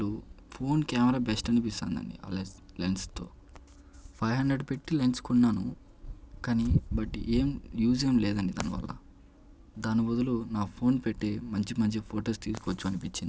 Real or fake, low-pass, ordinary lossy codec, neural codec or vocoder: real; none; none; none